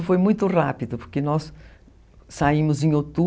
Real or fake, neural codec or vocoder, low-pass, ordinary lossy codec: real; none; none; none